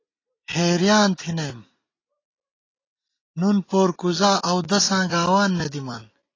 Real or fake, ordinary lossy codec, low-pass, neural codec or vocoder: real; AAC, 32 kbps; 7.2 kHz; none